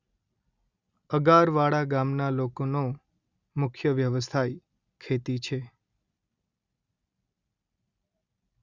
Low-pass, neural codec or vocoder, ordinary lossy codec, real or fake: 7.2 kHz; none; Opus, 64 kbps; real